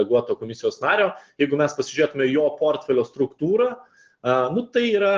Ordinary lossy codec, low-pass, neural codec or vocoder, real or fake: Opus, 16 kbps; 7.2 kHz; none; real